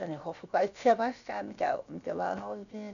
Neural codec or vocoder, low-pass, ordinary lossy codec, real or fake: codec, 16 kHz, about 1 kbps, DyCAST, with the encoder's durations; 7.2 kHz; none; fake